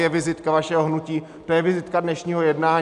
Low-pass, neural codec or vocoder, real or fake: 10.8 kHz; none; real